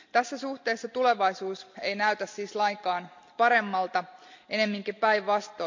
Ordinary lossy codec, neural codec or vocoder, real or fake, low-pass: MP3, 64 kbps; none; real; 7.2 kHz